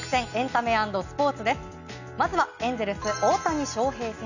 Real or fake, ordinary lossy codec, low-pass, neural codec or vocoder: real; none; 7.2 kHz; none